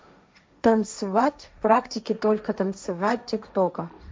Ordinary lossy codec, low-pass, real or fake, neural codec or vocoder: none; none; fake; codec, 16 kHz, 1.1 kbps, Voila-Tokenizer